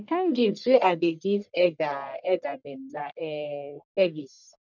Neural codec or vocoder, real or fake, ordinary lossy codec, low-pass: codec, 44.1 kHz, 1.7 kbps, Pupu-Codec; fake; none; 7.2 kHz